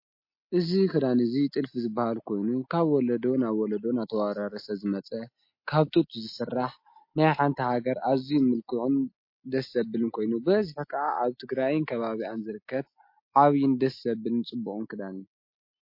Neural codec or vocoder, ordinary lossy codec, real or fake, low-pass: none; MP3, 32 kbps; real; 5.4 kHz